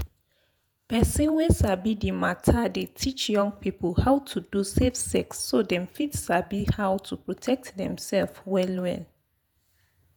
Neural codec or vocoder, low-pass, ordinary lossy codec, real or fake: vocoder, 48 kHz, 128 mel bands, Vocos; none; none; fake